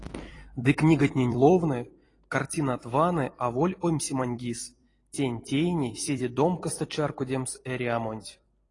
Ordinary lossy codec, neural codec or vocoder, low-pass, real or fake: AAC, 32 kbps; vocoder, 44.1 kHz, 128 mel bands every 256 samples, BigVGAN v2; 10.8 kHz; fake